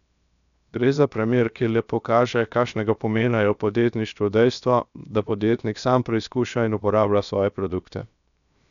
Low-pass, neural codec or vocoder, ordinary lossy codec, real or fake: 7.2 kHz; codec, 16 kHz, 0.7 kbps, FocalCodec; none; fake